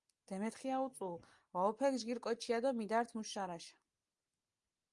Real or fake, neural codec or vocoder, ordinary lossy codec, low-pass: real; none; Opus, 32 kbps; 10.8 kHz